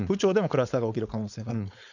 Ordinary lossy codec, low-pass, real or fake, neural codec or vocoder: none; 7.2 kHz; fake; codec, 16 kHz, 4.8 kbps, FACodec